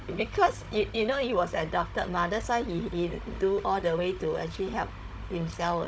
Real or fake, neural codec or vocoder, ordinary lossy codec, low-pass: fake; codec, 16 kHz, 16 kbps, FunCodec, trained on Chinese and English, 50 frames a second; none; none